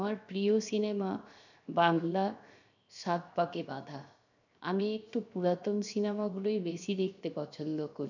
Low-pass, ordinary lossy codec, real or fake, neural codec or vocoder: 7.2 kHz; none; fake; codec, 16 kHz, 0.7 kbps, FocalCodec